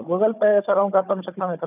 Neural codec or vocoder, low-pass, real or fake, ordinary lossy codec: codec, 16 kHz, 16 kbps, FunCodec, trained on Chinese and English, 50 frames a second; 3.6 kHz; fake; none